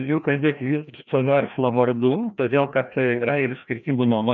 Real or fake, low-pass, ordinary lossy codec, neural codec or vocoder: fake; 7.2 kHz; MP3, 96 kbps; codec, 16 kHz, 1 kbps, FreqCodec, larger model